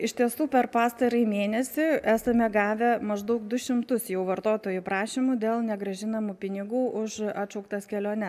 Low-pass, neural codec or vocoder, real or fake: 14.4 kHz; none; real